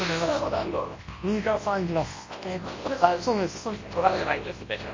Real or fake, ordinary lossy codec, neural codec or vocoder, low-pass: fake; MP3, 32 kbps; codec, 24 kHz, 0.9 kbps, WavTokenizer, large speech release; 7.2 kHz